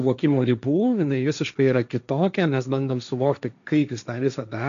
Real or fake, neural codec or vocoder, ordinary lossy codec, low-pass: fake; codec, 16 kHz, 1.1 kbps, Voila-Tokenizer; MP3, 96 kbps; 7.2 kHz